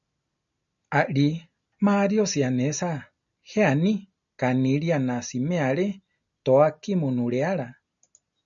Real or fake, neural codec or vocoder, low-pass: real; none; 7.2 kHz